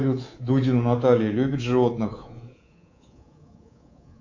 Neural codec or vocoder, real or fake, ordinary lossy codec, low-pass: codec, 24 kHz, 3.1 kbps, DualCodec; fake; AAC, 48 kbps; 7.2 kHz